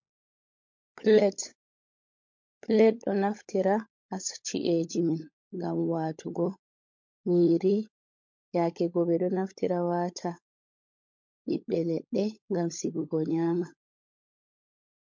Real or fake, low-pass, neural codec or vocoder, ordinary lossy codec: fake; 7.2 kHz; codec, 16 kHz, 16 kbps, FunCodec, trained on LibriTTS, 50 frames a second; MP3, 48 kbps